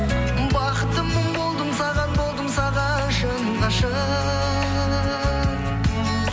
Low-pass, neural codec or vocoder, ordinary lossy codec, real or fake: none; none; none; real